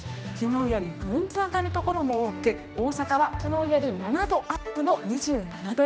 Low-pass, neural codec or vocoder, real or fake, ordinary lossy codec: none; codec, 16 kHz, 1 kbps, X-Codec, HuBERT features, trained on general audio; fake; none